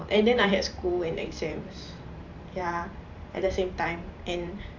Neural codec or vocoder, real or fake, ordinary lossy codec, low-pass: vocoder, 44.1 kHz, 128 mel bands every 512 samples, BigVGAN v2; fake; AAC, 48 kbps; 7.2 kHz